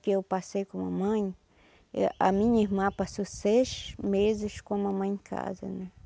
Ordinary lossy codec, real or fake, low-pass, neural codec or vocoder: none; real; none; none